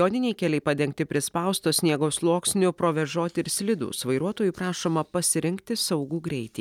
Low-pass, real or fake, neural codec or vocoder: 19.8 kHz; real; none